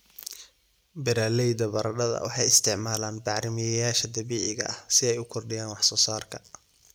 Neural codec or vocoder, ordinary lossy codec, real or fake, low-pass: none; none; real; none